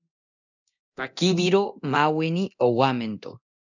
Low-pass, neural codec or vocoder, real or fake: 7.2 kHz; codec, 24 kHz, 0.9 kbps, DualCodec; fake